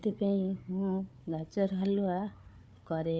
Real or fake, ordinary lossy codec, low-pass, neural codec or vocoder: fake; none; none; codec, 16 kHz, 4 kbps, FunCodec, trained on Chinese and English, 50 frames a second